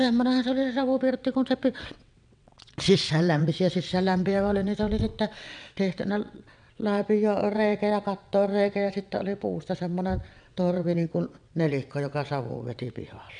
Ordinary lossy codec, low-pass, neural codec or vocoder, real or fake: none; 9.9 kHz; vocoder, 22.05 kHz, 80 mel bands, WaveNeXt; fake